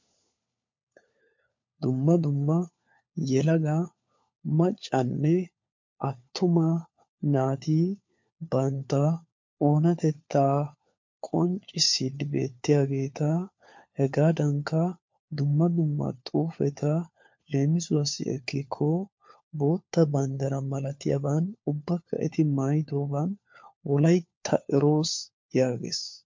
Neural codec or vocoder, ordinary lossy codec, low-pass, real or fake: codec, 16 kHz, 4 kbps, FunCodec, trained on LibriTTS, 50 frames a second; MP3, 48 kbps; 7.2 kHz; fake